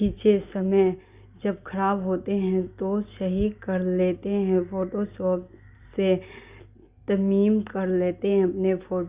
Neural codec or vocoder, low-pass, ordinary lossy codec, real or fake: none; 3.6 kHz; Opus, 64 kbps; real